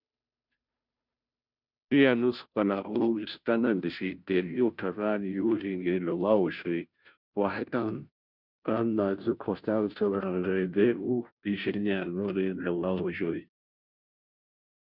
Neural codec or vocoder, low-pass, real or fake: codec, 16 kHz, 0.5 kbps, FunCodec, trained on Chinese and English, 25 frames a second; 5.4 kHz; fake